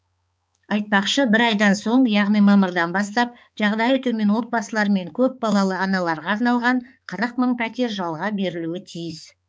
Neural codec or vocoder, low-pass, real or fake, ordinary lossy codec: codec, 16 kHz, 4 kbps, X-Codec, HuBERT features, trained on balanced general audio; none; fake; none